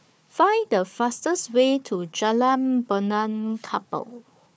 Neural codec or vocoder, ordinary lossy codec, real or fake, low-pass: codec, 16 kHz, 4 kbps, FunCodec, trained on Chinese and English, 50 frames a second; none; fake; none